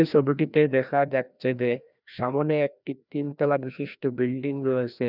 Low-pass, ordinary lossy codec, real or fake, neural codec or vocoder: 5.4 kHz; none; fake; codec, 16 kHz, 1 kbps, FreqCodec, larger model